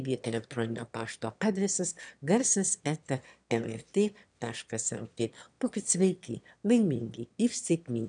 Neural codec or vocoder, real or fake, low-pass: autoencoder, 22.05 kHz, a latent of 192 numbers a frame, VITS, trained on one speaker; fake; 9.9 kHz